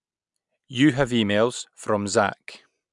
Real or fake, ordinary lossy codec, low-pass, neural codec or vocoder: real; none; 10.8 kHz; none